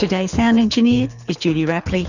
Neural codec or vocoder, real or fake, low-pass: codec, 24 kHz, 6 kbps, HILCodec; fake; 7.2 kHz